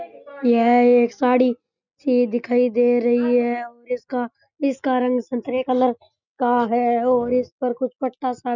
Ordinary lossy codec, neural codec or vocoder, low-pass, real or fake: none; none; 7.2 kHz; real